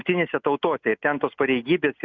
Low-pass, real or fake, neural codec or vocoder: 7.2 kHz; real; none